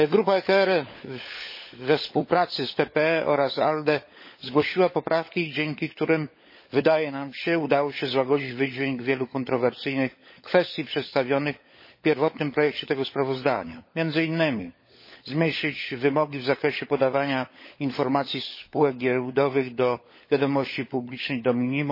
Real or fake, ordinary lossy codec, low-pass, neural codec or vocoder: fake; MP3, 24 kbps; 5.4 kHz; codec, 16 kHz, 16 kbps, FunCodec, trained on LibriTTS, 50 frames a second